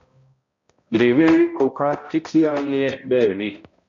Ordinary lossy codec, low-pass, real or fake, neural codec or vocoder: MP3, 48 kbps; 7.2 kHz; fake; codec, 16 kHz, 0.5 kbps, X-Codec, HuBERT features, trained on balanced general audio